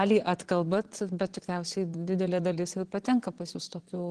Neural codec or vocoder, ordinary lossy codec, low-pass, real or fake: vocoder, 22.05 kHz, 80 mel bands, Vocos; Opus, 16 kbps; 9.9 kHz; fake